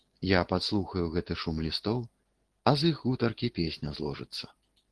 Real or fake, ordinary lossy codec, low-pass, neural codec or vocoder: real; Opus, 16 kbps; 10.8 kHz; none